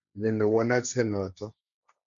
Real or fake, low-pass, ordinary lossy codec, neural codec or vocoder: fake; 7.2 kHz; none; codec, 16 kHz, 1.1 kbps, Voila-Tokenizer